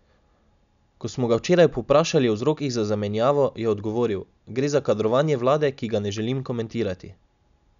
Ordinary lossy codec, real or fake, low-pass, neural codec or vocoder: MP3, 96 kbps; real; 7.2 kHz; none